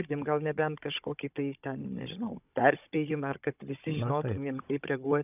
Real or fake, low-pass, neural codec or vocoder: fake; 3.6 kHz; codec, 16 kHz, 16 kbps, FunCodec, trained on Chinese and English, 50 frames a second